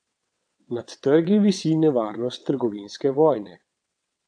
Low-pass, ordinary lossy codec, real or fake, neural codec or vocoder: 9.9 kHz; none; fake; vocoder, 22.05 kHz, 80 mel bands, WaveNeXt